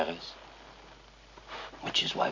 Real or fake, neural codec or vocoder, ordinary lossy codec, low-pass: real; none; MP3, 48 kbps; 7.2 kHz